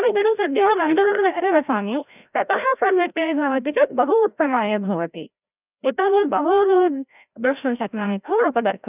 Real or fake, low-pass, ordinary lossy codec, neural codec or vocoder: fake; 3.6 kHz; none; codec, 16 kHz, 0.5 kbps, FreqCodec, larger model